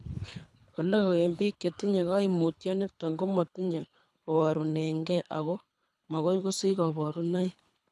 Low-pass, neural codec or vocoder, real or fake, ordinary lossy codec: none; codec, 24 kHz, 3 kbps, HILCodec; fake; none